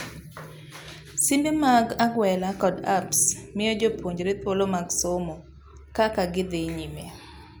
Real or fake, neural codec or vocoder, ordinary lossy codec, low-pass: real; none; none; none